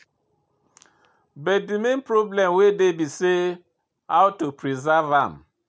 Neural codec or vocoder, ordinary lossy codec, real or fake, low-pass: none; none; real; none